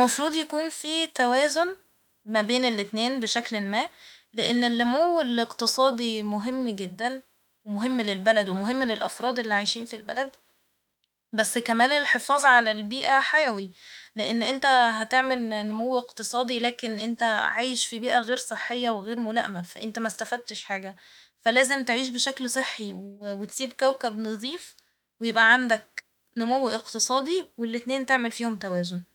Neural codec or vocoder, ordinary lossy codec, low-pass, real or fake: autoencoder, 48 kHz, 32 numbers a frame, DAC-VAE, trained on Japanese speech; none; 19.8 kHz; fake